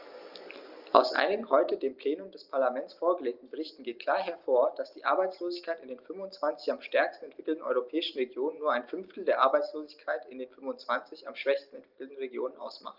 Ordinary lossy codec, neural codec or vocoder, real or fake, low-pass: Opus, 32 kbps; none; real; 5.4 kHz